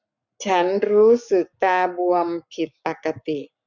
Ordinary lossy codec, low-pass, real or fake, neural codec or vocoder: none; 7.2 kHz; fake; codec, 44.1 kHz, 7.8 kbps, Pupu-Codec